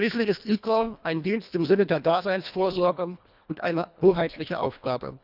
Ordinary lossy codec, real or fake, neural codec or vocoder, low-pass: none; fake; codec, 24 kHz, 1.5 kbps, HILCodec; 5.4 kHz